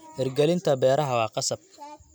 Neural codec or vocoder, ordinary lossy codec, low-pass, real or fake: none; none; none; real